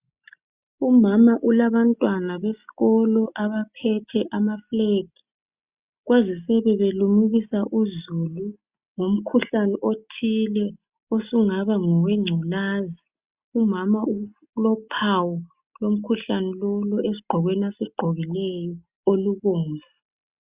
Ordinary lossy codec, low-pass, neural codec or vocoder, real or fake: Opus, 64 kbps; 3.6 kHz; none; real